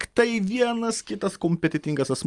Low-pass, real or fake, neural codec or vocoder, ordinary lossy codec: 10.8 kHz; real; none; Opus, 32 kbps